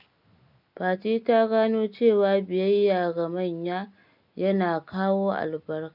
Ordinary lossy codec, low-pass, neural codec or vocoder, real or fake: none; 5.4 kHz; none; real